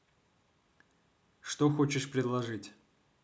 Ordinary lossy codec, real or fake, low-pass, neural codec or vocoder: none; real; none; none